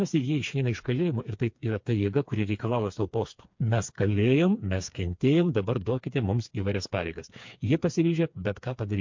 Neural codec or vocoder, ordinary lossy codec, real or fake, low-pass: codec, 16 kHz, 4 kbps, FreqCodec, smaller model; MP3, 48 kbps; fake; 7.2 kHz